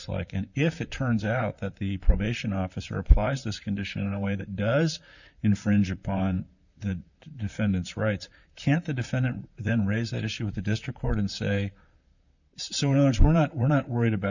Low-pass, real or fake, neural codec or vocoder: 7.2 kHz; fake; vocoder, 44.1 kHz, 128 mel bands, Pupu-Vocoder